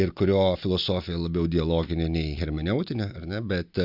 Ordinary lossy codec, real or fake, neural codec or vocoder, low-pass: AAC, 48 kbps; real; none; 5.4 kHz